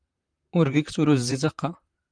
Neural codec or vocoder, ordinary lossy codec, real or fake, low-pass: vocoder, 44.1 kHz, 128 mel bands, Pupu-Vocoder; Opus, 32 kbps; fake; 9.9 kHz